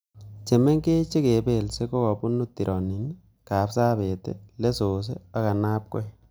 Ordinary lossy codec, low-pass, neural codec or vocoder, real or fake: none; none; none; real